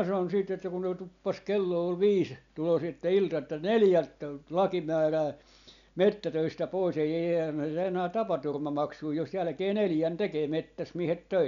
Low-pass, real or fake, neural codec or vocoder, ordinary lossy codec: 7.2 kHz; real; none; none